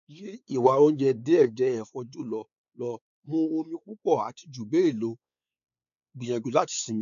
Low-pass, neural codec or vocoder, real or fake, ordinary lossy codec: 7.2 kHz; codec, 16 kHz, 4 kbps, X-Codec, WavLM features, trained on Multilingual LibriSpeech; fake; none